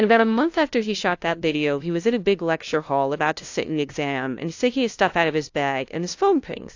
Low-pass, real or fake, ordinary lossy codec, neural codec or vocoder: 7.2 kHz; fake; AAC, 48 kbps; codec, 16 kHz, 0.5 kbps, FunCodec, trained on LibriTTS, 25 frames a second